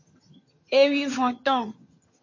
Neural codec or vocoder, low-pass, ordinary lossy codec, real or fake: vocoder, 22.05 kHz, 80 mel bands, HiFi-GAN; 7.2 kHz; MP3, 32 kbps; fake